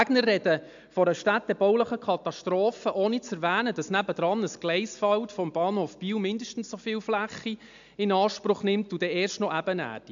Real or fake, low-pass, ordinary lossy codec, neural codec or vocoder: real; 7.2 kHz; MP3, 64 kbps; none